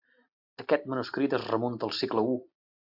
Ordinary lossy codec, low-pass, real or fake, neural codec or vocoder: AAC, 48 kbps; 5.4 kHz; real; none